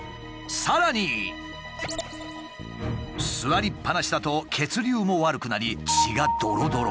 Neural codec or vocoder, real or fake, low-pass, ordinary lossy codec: none; real; none; none